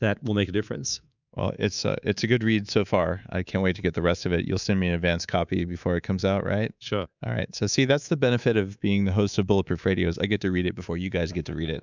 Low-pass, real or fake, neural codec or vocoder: 7.2 kHz; fake; codec, 24 kHz, 3.1 kbps, DualCodec